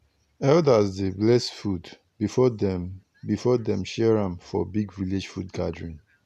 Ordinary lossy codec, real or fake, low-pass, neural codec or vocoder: none; real; 14.4 kHz; none